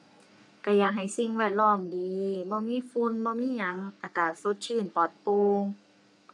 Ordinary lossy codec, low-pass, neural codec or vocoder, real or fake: none; 10.8 kHz; codec, 44.1 kHz, 3.4 kbps, Pupu-Codec; fake